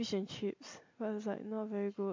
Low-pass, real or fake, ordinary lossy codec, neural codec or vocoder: 7.2 kHz; real; MP3, 48 kbps; none